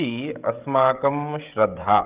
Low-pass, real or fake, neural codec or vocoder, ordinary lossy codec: 3.6 kHz; fake; codec, 16 kHz, 16 kbps, FreqCodec, smaller model; Opus, 24 kbps